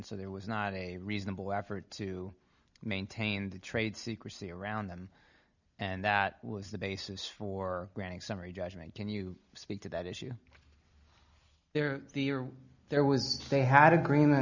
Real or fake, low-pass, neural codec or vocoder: real; 7.2 kHz; none